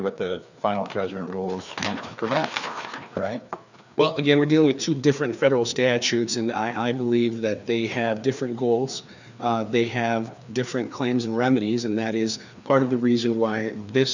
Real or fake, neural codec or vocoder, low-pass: fake; codec, 16 kHz, 2 kbps, FreqCodec, larger model; 7.2 kHz